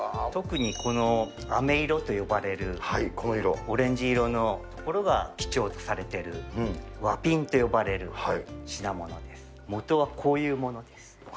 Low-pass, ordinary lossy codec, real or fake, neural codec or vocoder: none; none; real; none